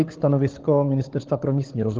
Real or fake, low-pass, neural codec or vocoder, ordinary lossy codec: fake; 7.2 kHz; codec, 16 kHz, 16 kbps, FunCodec, trained on LibriTTS, 50 frames a second; Opus, 16 kbps